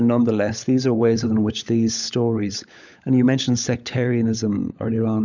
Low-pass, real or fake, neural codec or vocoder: 7.2 kHz; fake; codec, 16 kHz, 16 kbps, FunCodec, trained on LibriTTS, 50 frames a second